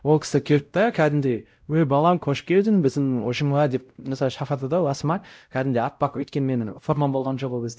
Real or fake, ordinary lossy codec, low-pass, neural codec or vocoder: fake; none; none; codec, 16 kHz, 0.5 kbps, X-Codec, WavLM features, trained on Multilingual LibriSpeech